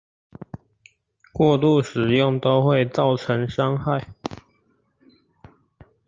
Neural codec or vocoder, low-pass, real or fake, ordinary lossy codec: none; 7.2 kHz; real; Opus, 24 kbps